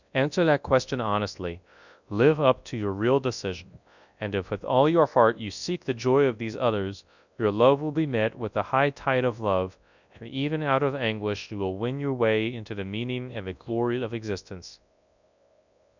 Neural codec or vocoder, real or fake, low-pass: codec, 24 kHz, 0.9 kbps, WavTokenizer, large speech release; fake; 7.2 kHz